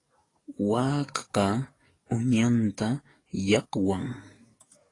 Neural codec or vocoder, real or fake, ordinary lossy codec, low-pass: codec, 44.1 kHz, 7.8 kbps, DAC; fake; AAC, 32 kbps; 10.8 kHz